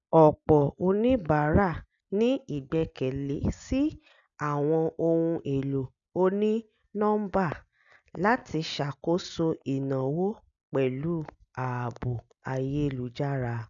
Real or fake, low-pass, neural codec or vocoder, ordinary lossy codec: real; 7.2 kHz; none; none